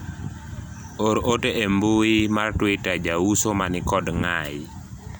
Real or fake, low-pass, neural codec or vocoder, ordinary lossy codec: real; none; none; none